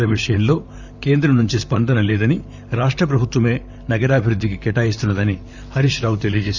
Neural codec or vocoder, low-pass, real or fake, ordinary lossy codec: vocoder, 44.1 kHz, 128 mel bands, Pupu-Vocoder; 7.2 kHz; fake; none